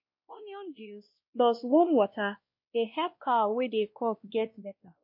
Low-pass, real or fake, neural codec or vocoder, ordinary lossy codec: 5.4 kHz; fake; codec, 16 kHz, 1 kbps, X-Codec, WavLM features, trained on Multilingual LibriSpeech; MP3, 32 kbps